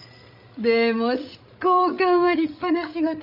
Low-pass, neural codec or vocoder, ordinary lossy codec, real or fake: 5.4 kHz; codec, 16 kHz, 16 kbps, FreqCodec, larger model; none; fake